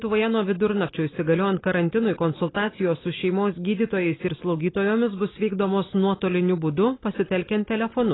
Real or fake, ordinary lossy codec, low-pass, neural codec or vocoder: real; AAC, 16 kbps; 7.2 kHz; none